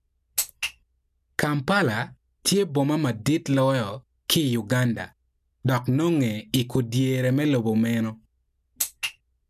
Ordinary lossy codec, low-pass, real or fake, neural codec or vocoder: none; 14.4 kHz; real; none